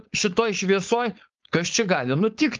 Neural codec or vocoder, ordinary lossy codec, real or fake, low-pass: codec, 16 kHz, 4.8 kbps, FACodec; Opus, 24 kbps; fake; 7.2 kHz